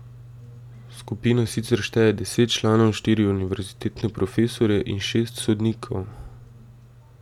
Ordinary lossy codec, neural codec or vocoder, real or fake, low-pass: none; none; real; 19.8 kHz